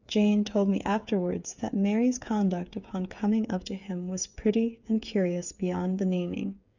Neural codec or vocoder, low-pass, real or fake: codec, 16 kHz, 8 kbps, FreqCodec, smaller model; 7.2 kHz; fake